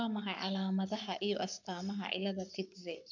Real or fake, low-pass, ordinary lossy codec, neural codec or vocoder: fake; 7.2 kHz; MP3, 64 kbps; codec, 44.1 kHz, 7.8 kbps, Pupu-Codec